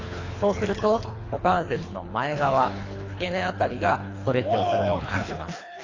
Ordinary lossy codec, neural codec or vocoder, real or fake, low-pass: AAC, 32 kbps; codec, 24 kHz, 3 kbps, HILCodec; fake; 7.2 kHz